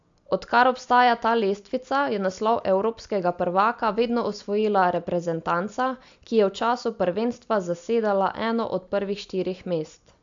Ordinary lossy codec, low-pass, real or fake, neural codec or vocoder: AAC, 64 kbps; 7.2 kHz; real; none